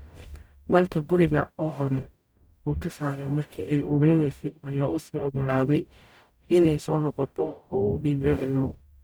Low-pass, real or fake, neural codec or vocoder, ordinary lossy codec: none; fake; codec, 44.1 kHz, 0.9 kbps, DAC; none